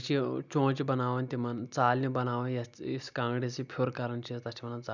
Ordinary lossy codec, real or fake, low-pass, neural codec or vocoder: none; real; 7.2 kHz; none